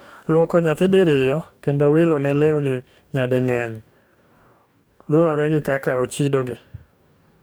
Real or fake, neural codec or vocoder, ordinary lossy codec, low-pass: fake; codec, 44.1 kHz, 2.6 kbps, DAC; none; none